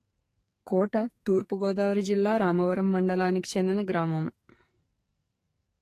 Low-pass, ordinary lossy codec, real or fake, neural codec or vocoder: 14.4 kHz; AAC, 48 kbps; fake; codec, 44.1 kHz, 2.6 kbps, SNAC